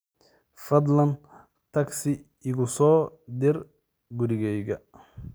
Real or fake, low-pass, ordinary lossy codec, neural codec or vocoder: real; none; none; none